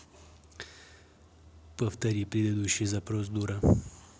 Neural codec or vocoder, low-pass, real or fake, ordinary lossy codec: none; none; real; none